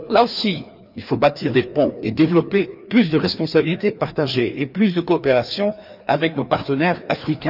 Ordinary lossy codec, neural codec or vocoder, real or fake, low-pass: none; codec, 16 kHz, 2 kbps, FreqCodec, larger model; fake; 5.4 kHz